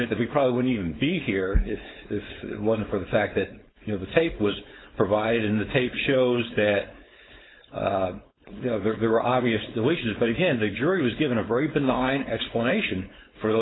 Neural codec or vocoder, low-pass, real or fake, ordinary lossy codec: codec, 16 kHz, 4.8 kbps, FACodec; 7.2 kHz; fake; AAC, 16 kbps